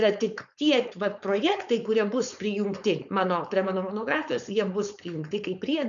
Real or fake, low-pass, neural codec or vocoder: fake; 7.2 kHz; codec, 16 kHz, 4.8 kbps, FACodec